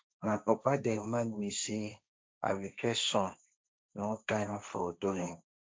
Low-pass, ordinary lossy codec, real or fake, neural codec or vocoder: none; none; fake; codec, 16 kHz, 1.1 kbps, Voila-Tokenizer